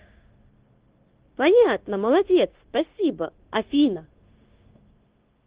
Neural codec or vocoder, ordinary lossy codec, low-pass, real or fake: codec, 16 kHz, 0.9 kbps, LongCat-Audio-Codec; Opus, 16 kbps; 3.6 kHz; fake